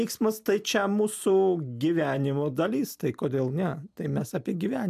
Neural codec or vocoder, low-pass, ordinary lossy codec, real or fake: none; 14.4 kHz; AAC, 96 kbps; real